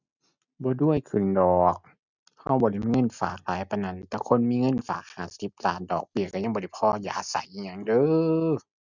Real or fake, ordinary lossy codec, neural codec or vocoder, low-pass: real; none; none; 7.2 kHz